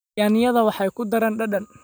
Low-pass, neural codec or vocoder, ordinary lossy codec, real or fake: none; none; none; real